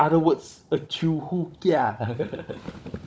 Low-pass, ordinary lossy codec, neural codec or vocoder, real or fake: none; none; codec, 16 kHz, 16 kbps, FunCodec, trained on Chinese and English, 50 frames a second; fake